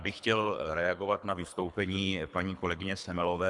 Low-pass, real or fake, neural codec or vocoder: 10.8 kHz; fake; codec, 24 kHz, 3 kbps, HILCodec